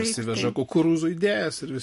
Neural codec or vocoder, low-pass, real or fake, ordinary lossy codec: none; 14.4 kHz; real; MP3, 48 kbps